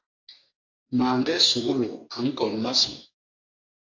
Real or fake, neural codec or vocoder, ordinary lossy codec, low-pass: fake; codec, 44.1 kHz, 2.6 kbps, DAC; AAC, 48 kbps; 7.2 kHz